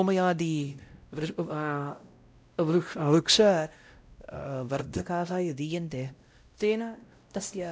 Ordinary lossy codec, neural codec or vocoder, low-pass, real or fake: none; codec, 16 kHz, 0.5 kbps, X-Codec, WavLM features, trained on Multilingual LibriSpeech; none; fake